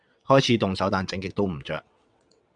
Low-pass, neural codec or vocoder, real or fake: 9.9 kHz; vocoder, 22.05 kHz, 80 mel bands, WaveNeXt; fake